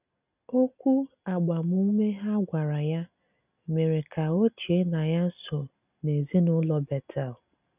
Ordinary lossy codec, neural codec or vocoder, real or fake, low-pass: none; none; real; 3.6 kHz